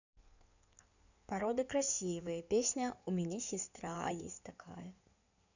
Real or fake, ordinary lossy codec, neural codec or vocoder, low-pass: fake; none; codec, 16 kHz in and 24 kHz out, 2.2 kbps, FireRedTTS-2 codec; 7.2 kHz